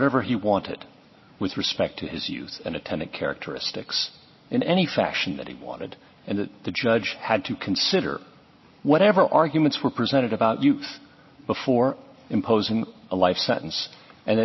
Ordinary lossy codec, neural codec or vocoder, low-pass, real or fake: MP3, 24 kbps; none; 7.2 kHz; real